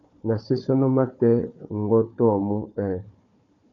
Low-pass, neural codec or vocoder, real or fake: 7.2 kHz; codec, 16 kHz, 4 kbps, FunCodec, trained on Chinese and English, 50 frames a second; fake